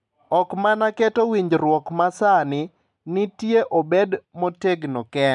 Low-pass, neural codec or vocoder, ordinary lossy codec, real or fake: 10.8 kHz; none; MP3, 96 kbps; real